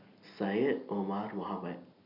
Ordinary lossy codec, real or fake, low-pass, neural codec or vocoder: none; real; 5.4 kHz; none